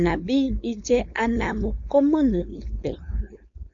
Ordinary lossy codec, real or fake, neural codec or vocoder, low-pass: MP3, 96 kbps; fake; codec, 16 kHz, 4.8 kbps, FACodec; 7.2 kHz